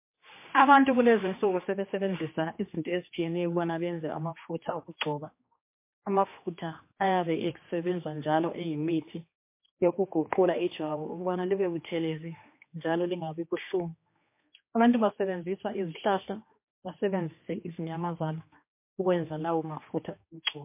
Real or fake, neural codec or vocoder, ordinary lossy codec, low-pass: fake; codec, 16 kHz, 2 kbps, X-Codec, HuBERT features, trained on general audio; MP3, 24 kbps; 3.6 kHz